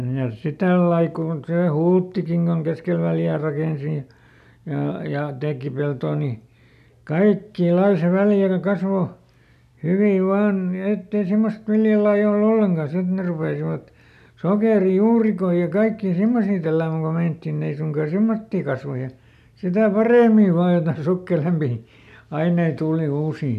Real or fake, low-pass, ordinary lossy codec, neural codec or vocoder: real; 14.4 kHz; none; none